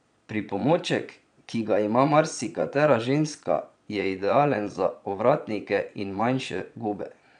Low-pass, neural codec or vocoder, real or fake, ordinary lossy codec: 9.9 kHz; vocoder, 22.05 kHz, 80 mel bands, Vocos; fake; MP3, 96 kbps